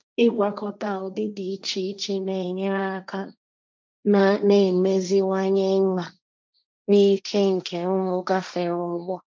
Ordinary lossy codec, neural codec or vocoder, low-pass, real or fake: none; codec, 16 kHz, 1.1 kbps, Voila-Tokenizer; none; fake